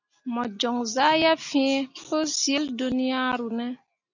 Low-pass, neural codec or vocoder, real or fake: 7.2 kHz; none; real